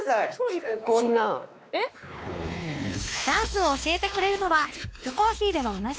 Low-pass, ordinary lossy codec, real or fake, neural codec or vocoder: none; none; fake; codec, 16 kHz, 2 kbps, X-Codec, WavLM features, trained on Multilingual LibriSpeech